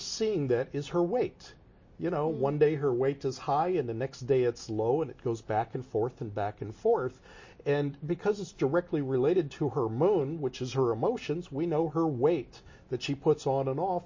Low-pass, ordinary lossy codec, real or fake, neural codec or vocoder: 7.2 kHz; MP3, 32 kbps; real; none